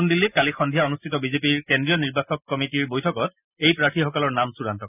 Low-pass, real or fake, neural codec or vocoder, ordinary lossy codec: 3.6 kHz; real; none; none